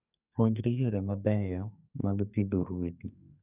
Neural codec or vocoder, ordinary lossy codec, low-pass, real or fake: codec, 44.1 kHz, 2.6 kbps, SNAC; none; 3.6 kHz; fake